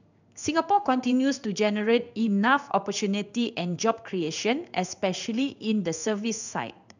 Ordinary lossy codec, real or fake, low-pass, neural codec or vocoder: none; fake; 7.2 kHz; codec, 16 kHz in and 24 kHz out, 1 kbps, XY-Tokenizer